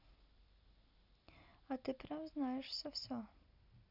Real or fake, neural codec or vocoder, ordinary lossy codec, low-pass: real; none; none; 5.4 kHz